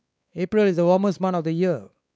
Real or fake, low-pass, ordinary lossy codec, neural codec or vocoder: fake; none; none; codec, 16 kHz, 4 kbps, X-Codec, WavLM features, trained on Multilingual LibriSpeech